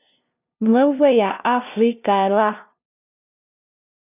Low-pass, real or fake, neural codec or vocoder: 3.6 kHz; fake; codec, 16 kHz, 0.5 kbps, FunCodec, trained on LibriTTS, 25 frames a second